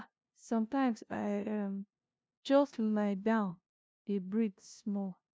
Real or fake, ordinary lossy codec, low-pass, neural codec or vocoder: fake; none; none; codec, 16 kHz, 0.5 kbps, FunCodec, trained on LibriTTS, 25 frames a second